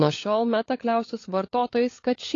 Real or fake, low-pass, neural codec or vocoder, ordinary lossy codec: fake; 7.2 kHz; codec, 16 kHz, 16 kbps, FunCodec, trained on LibriTTS, 50 frames a second; AAC, 32 kbps